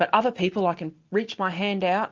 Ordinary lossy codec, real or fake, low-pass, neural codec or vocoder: Opus, 32 kbps; real; 7.2 kHz; none